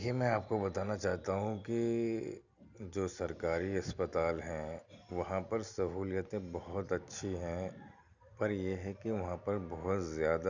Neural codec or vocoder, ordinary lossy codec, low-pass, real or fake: none; none; 7.2 kHz; real